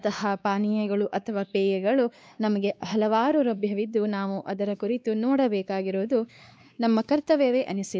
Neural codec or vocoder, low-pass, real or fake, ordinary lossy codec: codec, 16 kHz, 2 kbps, X-Codec, WavLM features, trained on Multilingual LibriSpeech; none; fake; none